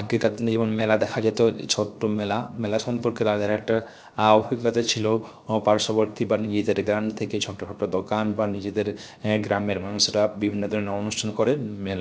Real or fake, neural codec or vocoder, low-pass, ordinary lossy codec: fake; codec, 16 kHz, 0.7 kbps, FocalCodec; none; none